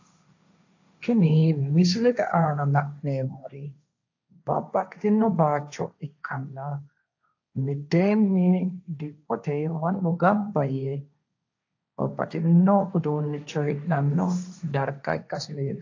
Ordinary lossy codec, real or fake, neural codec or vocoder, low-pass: AAC, 48 kbps; fake; codec, 16 kHz, 1.1 kbps, Voila-Tokenizer; 7.2 kHz